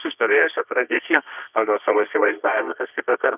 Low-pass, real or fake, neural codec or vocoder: 3.6 kHz; fake; codec, 24 kHz, 0.9 kbps, WavTokenizer, medium music audio release